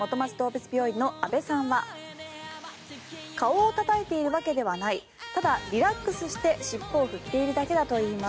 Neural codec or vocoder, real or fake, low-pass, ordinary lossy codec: none; real; none; none